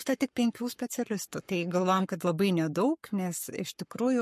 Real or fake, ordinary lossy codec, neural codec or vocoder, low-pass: fake; MP3, 64 kbps; codec, 44.1 kHz, 7.8 kbps, Pupu-Codec; 14.4 kHz